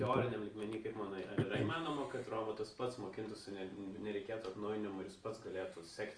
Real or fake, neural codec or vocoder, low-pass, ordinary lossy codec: real; none; 9.9 kHz; Opus, 32 kbps